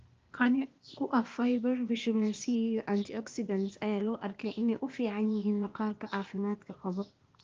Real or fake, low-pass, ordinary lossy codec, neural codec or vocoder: fake; 7.2 kHz; Opus, 24 kbps; codec, 16 kHz, 0.8 kbps, ZipCodec